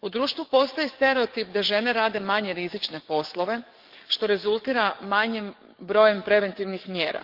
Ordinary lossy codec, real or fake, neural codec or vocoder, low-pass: Opus, 24 kbps; fake; codec, 44.1 kHz, 7.8 kbps, DAC; 5.4 kHz